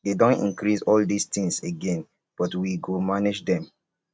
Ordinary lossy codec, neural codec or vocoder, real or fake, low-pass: none; none; real; none